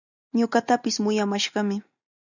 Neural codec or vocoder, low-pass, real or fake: none; 7.2 kHz; real